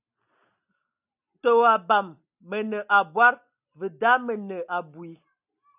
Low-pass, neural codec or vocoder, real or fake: 3.6 kHz; none; real